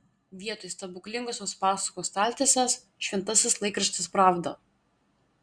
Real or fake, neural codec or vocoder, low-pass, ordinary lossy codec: real; none; 9.9 kHz; Opus, 64 kbps